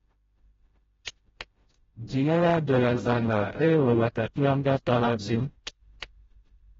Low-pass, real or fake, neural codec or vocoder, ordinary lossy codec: 7.2 kHz; fake; codec, 16 kHz, 0.5 kbps, FreqCodec, smaller model; AAC, 24 kbps